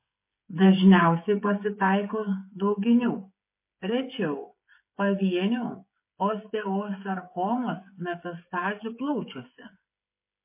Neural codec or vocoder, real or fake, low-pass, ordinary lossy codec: codec, 16 kHz, 8 kbps, FreqCodec, smaller model; fake; 3.6 kHz; MP3, 24 kbps